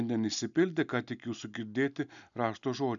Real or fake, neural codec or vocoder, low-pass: real; none; 7.2 kHz